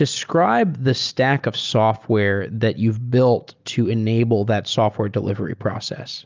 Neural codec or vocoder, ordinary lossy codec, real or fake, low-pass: none; Opus, 24 kbps; real; 7.2 kHz